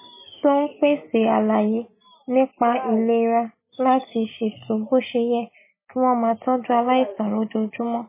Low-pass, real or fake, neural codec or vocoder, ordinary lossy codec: 3.6 kHz; real; none; MP3, 16 kbps